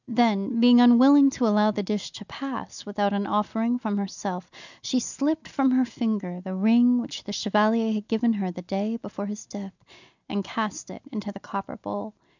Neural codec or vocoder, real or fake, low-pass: none; real; 7.2 kHz